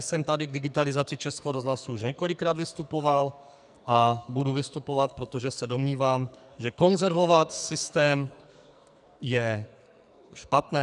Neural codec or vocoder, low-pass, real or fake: codec, 44.1 kHz, 2.6 kbps, SNAC; 10.8 kHz; fake